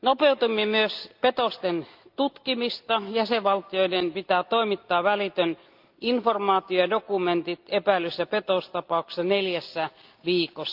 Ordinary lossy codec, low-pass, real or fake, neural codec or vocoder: Opus, 24 kbps; 5.4 kHz; real; none